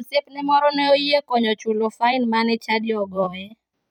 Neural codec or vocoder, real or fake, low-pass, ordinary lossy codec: vocoder, 44.1 kHz, 128 mel bands every 256 samples, BigVGAN v2; fake; 19.8 kHz; MP3, 96 kbps